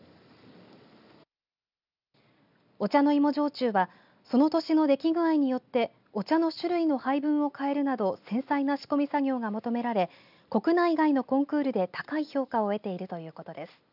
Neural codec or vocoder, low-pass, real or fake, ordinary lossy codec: none; 5.4 kHz; real; none